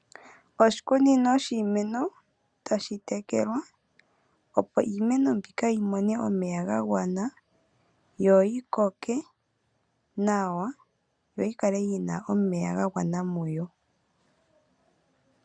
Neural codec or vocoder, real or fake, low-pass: none; real; 9.9 kHz